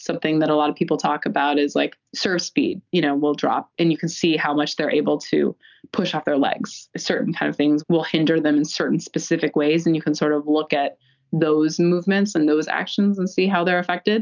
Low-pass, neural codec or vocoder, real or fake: 7.2 kHz; none; real